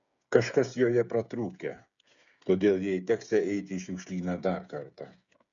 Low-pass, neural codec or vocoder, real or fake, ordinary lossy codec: 7.2 kHz; codec, 16 kHz, 8 kbps, FreqCodec, smaller model; fake; MP3, 96 kbps